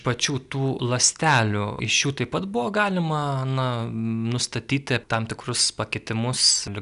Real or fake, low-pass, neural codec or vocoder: real; 10.8 kHz; none